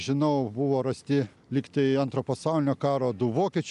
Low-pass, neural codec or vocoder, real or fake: 10.8 kHz; none; real